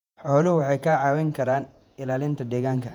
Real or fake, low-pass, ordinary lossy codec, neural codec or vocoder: fake; 19.8 kHz; none; vocoder, 48 kHz, 128 mel bands, Vocos